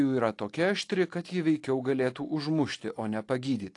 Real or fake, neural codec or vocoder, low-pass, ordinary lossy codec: real; none; 10.8 kHz; AAC, 48 kbps